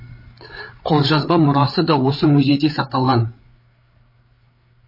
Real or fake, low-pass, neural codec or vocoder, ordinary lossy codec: fake; 5.4 kHz; codec, 16 kHz, 8 kbps, FreqCodec, larger model; MP3, 24 kbps